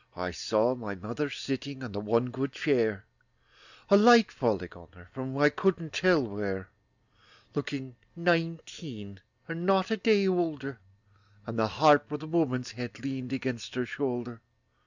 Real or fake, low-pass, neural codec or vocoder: real; 7.2 kHz; none